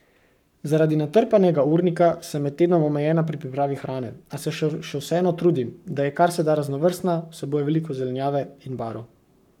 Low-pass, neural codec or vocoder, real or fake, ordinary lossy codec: 19.8 kHz; codec, 44.1 kHz, 7.8 kbps, Pupu-Codec; fake; none